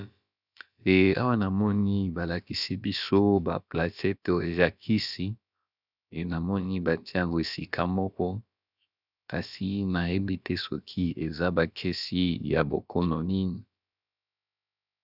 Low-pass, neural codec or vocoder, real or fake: 5.4 kHz; codec, 16 kHz, about 1 kbps, DyCAST, with the encoder's durations; fake